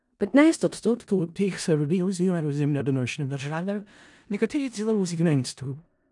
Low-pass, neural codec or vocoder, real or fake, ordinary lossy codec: 10.8 kHz; codec, 16 kHz in and 24 kHz out, 0.4 kbps, LongCat-Audio-Codec, four codebook decoder; fake; none